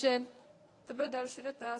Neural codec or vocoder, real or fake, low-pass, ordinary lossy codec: codec, 24 kHz, 0.9 kbps, WavTokenizer, medium speech release version 1; fake; 10.8 kHz; AAC, 48 kbps